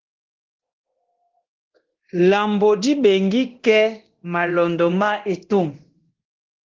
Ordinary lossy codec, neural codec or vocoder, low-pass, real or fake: Opus, 16 kbps; codec, 24 kHz, 0.9 kbps, DualCodec; 7.2 kHz; fake